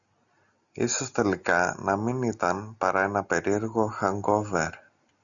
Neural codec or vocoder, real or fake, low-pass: none; real; 7.2 kHz